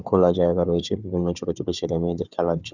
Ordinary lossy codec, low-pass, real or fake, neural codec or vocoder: none; 7.2 kHz; fake; codec, 16 kHz, 4 kbps, FunCodec, trained on Chinese and English, 50 frames a second